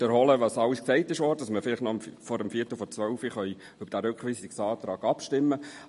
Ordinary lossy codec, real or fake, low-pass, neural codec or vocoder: MP3, 48 kbps; real; 14.4 kHz; none